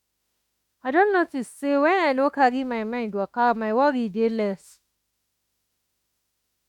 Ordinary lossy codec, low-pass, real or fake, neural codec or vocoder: none; 19.8 kHz; fake; autoencoder, 48 kHz, 32 numbers a frame, DAC-VAE, trained on Japanese speech